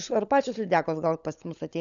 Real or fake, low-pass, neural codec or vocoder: fake; 7.2 kHz; codec, 16 kHz, 16 kbps, FunCodec, trained on LibriTTS, 50 frames a second